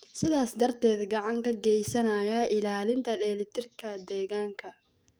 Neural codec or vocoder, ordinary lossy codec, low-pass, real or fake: codec, 44.1 kHz, 7.8 kbps, DAC; none; none; fake